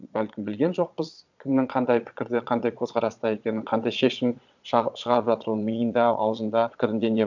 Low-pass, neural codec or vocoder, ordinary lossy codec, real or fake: 7.2 kHz; vocoder, 22.05 kHz, 80 mel bands, Vocos; none; fake